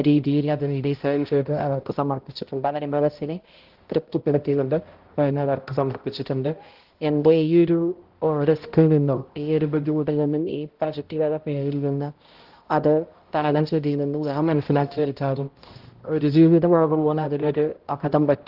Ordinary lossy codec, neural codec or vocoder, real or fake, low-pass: Opus, 16 kbps; codec, 16 kHz, 0.5 kbps, X-Codec, HuBERT features, trained on balanced general audio; fake; 5.4 kHz